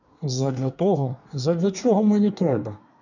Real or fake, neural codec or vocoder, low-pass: fake; codec, 24 kHz, 1 kbps, SNAC; 7.2 kHz